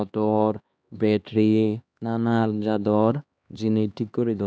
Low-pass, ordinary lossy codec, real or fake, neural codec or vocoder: none; none; fake; codec, 16 kHz, 2 kbps, X-Codec, HuBERT features, trained on LibriSpeech